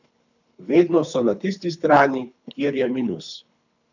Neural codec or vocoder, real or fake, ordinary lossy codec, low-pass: codec, 24 kHz, 3 kbps, HILCodec; fake; none; 7.2 kHz